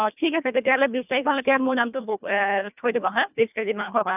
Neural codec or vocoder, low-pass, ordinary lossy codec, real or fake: codec, 24 kHz, 1.5 kbps, HILCodec; 3.6 kHz; none; fake